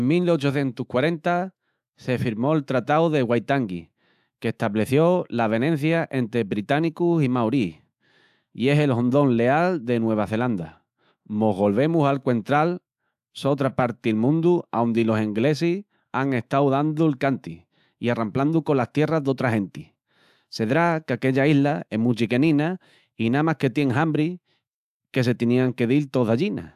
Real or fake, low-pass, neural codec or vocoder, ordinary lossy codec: fake; 14.4 kHz; autoencoder, 48 kHz, 128 numbers a frame, DAC-VAE, trained on Japanese speech; AAC, 96 kbps